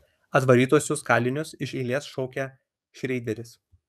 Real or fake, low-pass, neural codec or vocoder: fake; 14.4 kHz; codec, 44.1 kHz, 7.8 kbps, DAC